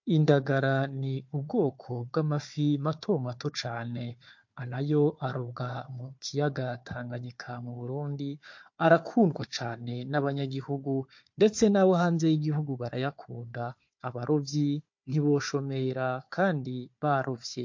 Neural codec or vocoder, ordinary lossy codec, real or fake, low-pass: codec, 16 kHz, 4 kbps, FunCodec, trained on Chinese and English, 50 frames a second; MP3, 48 kbps; fake; 7.2 kHz